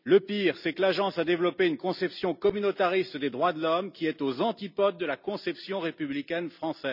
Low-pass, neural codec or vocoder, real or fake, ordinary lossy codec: 5.4 kHz; none; real; none